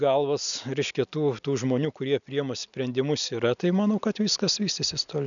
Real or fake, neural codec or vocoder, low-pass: real; none; 7.2 kHz